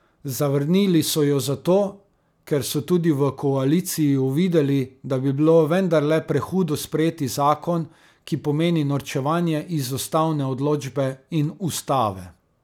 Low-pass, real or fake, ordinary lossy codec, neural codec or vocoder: 19.8 kHz; real; none; none